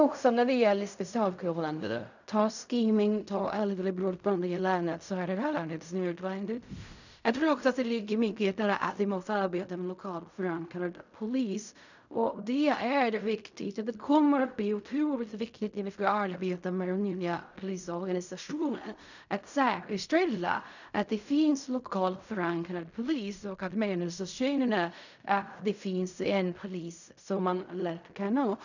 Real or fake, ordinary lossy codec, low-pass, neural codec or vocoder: fake; none; 7.2 kHz; codec, 16 kHz in and 24 kHz out, 0.4 kbps, LongCat-Audio-Codec, fine tuned four codebook decoder